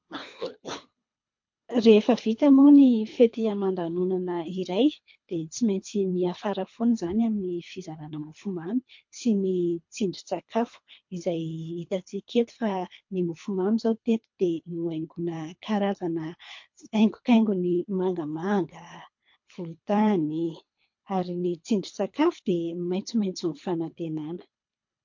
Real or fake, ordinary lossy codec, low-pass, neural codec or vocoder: fake; MP3, 48 kbps; 7.2 kHz; codec, 24 kHz, 3 kbps, HILCodec